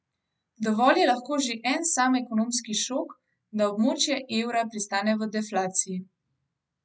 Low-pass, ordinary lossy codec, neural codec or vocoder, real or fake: none; none; none; real